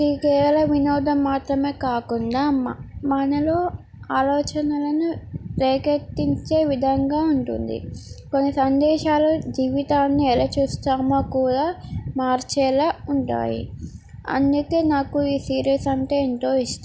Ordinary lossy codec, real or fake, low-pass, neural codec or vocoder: none; real; none; none